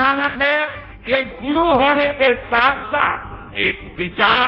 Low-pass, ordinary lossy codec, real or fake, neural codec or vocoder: 5.4 kHz; none; fake; codec, 16 kHz in and 24 kHz out, 0.6 kbps, FireRedTTS-2 codec